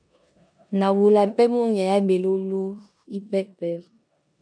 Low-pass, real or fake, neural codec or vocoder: 9.9 kHz; fake; codec, 16 kHz in and 24 kHz out, 0.9 kbps, LongCat-Audio-Codec, four codebook decoder